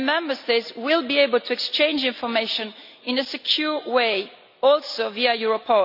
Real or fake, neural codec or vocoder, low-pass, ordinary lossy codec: real; none; 5.4 kHz; none